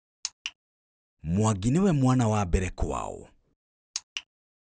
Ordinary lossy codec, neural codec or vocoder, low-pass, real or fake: none; none; none; real